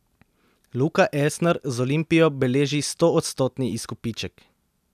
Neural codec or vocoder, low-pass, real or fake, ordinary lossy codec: none; 14.4 kHz; real; none